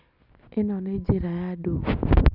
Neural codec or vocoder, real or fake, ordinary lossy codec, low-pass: none; real; none; 5.4 kHz